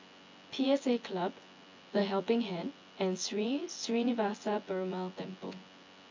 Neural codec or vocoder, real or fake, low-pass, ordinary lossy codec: vocoder, 24 kHz, 100 mel bands, Vocos; fake; 7.2 kHz; AAC, 48 kbps